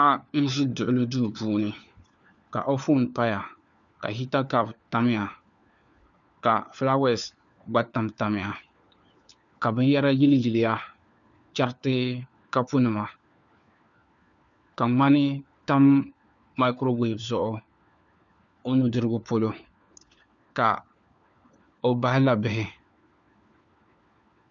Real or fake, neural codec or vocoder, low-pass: fake; codec, 16 kHz, 4 kbps, FunCodec, trained on LibriTTS, 50 frames a second; 7.2 kHz